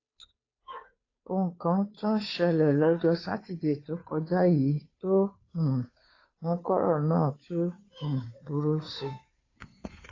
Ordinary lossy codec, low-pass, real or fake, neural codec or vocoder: AAC, 32 kbps; 7.2 kHz; fake; codec, 16 kHz, 2 kbps, FunCodec, trained on Chinese and English, 25 frames a second